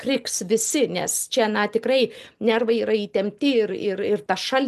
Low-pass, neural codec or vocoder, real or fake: 14.4 kHz; none; real